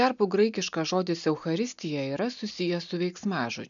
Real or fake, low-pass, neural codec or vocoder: real; 7.2 kHz; none